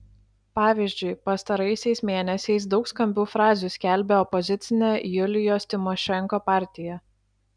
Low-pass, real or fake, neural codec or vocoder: 9.9 kHz; real; none